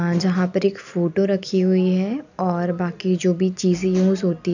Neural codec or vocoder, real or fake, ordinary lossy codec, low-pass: none; real; none; 7.2 kHz